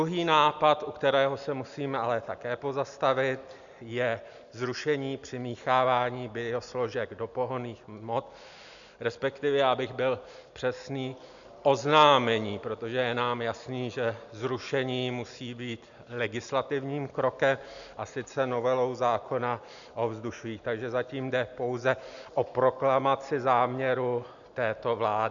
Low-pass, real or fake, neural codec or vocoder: 7.2 kHz; real; none